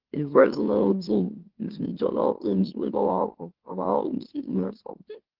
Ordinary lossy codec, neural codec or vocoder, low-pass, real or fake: Opus, 16 kbps; autoencoder, 44.1 kHz, a latent of 192 numbers a frame, MeloTTS; 5.4 kHz; fake